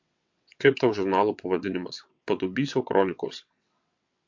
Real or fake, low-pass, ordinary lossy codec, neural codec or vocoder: fake; 7.2 kHz; MP3, 48 kbps; vocoder, 22.05 kHz, 80 mel bands, WaveNeXt